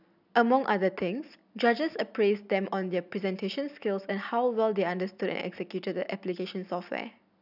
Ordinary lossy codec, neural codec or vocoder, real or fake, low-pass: none; none; real; 5.4 kHz